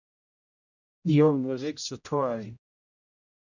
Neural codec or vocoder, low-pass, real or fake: codec, 16 kHz, 0.5 kbps, X-Codec, HuBERT features, trained on general audio; 7.2 kHz; fake